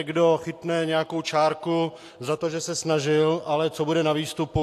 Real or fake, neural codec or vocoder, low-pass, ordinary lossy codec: real; none; 14.4 kHz; AAC, 64 kbps